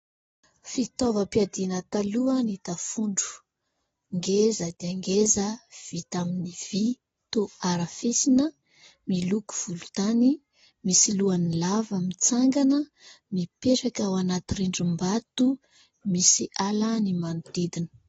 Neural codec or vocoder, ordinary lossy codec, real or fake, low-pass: none; AAC, 24 kbps; real; 19.8 kHz